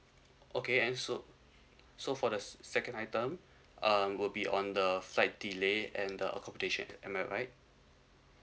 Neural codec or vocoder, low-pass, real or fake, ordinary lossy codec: none; none; real; none